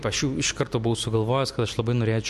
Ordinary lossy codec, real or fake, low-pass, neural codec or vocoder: MP3, 64 kbps; real; 14.4 kHz; none